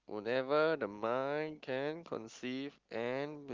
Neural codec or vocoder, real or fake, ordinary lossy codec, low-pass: none; real; Opus, 32 kbps; 7.2 kHz